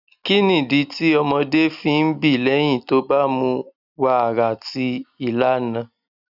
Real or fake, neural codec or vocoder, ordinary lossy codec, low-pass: real; none; none; 5.4 kHz